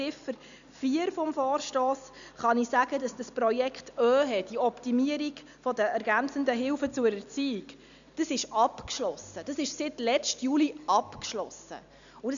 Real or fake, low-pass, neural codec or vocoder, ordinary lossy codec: real; 7.2 kHz; none; none